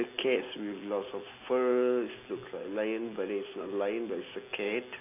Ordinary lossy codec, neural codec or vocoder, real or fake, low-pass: AAC, 24 kbps; none; real; 3.6 kHz